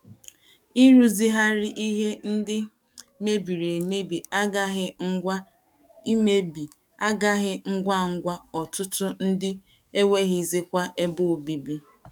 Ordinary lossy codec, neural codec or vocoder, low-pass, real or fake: none; codec, 44.1 kHz, 7.8 kbps, DAC; 19.8 kHz; fake